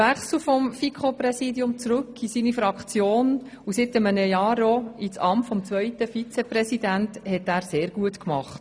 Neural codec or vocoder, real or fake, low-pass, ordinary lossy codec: none; real; none; none